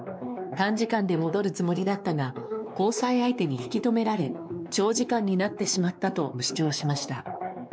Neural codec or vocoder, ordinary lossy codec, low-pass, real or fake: codec, 16 kHz, 2 kbps, X-Codec, WavLM features, trained on Multilingual LibriSpeech; none; none; fake